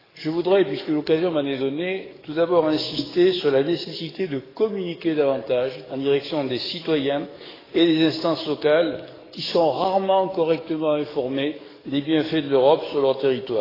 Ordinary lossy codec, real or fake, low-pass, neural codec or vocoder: AAC, 24 kbps; fake; 5.4 kHz; codec, 44.1 kHz, 7.8 kbps, DAC